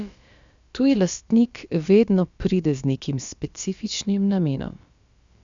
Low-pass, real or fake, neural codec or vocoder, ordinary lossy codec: 7.2 kHz; fake; codec, 16 kHz, about 1 kbps, DyCAST, with the encoder's durations; Opus, 64 kbps